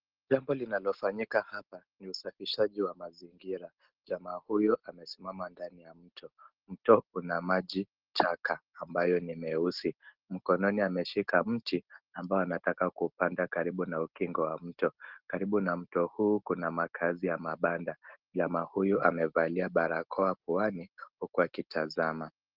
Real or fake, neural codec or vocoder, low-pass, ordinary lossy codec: real; none; 5.4 kHz; Opus, 16 kbps